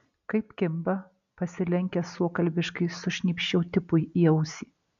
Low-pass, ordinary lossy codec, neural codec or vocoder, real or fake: 7.2 kHz; MP3, 96 kbps; none; real